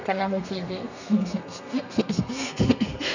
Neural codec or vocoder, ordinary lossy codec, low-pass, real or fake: codec, 32 kHz, 1.9 kbps, SNAC; none; 7.2 kHz; fake